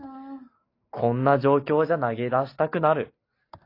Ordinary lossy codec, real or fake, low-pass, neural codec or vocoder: AAC, 32 kbps; real; 5.4 kHz; none